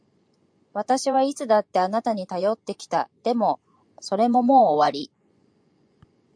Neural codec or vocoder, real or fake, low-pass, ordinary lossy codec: vocoder, 24 kHz, 100 mel bands, Vocos; fake; 9.9 kHz; AAC, 64 kbps